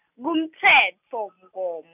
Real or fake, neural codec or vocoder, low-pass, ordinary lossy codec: real; none; 3.6 kHz; none